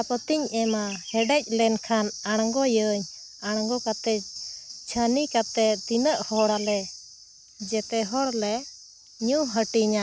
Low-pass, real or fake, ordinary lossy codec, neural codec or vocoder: none; real; none; none